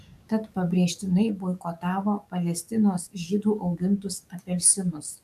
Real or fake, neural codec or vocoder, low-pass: fake; codec, 44.1 kHz, 7.8 kbps, DAC; 14.4 kHz